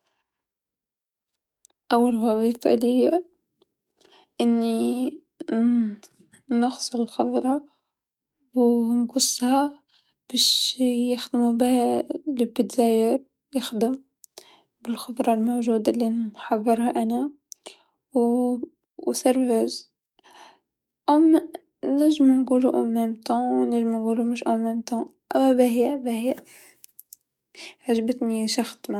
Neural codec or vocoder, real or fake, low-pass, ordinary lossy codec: codec, 44.1 kHz, 7.8 kbps, DAC; fake; 19.8 kHz; MP3, 96 kbps